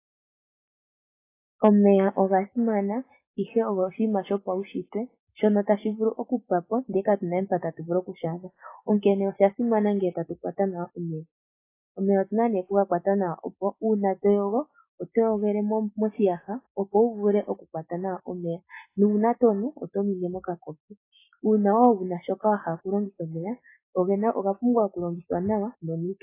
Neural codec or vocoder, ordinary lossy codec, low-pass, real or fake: none; AAC, 24 kbps; 3.6 kHz; real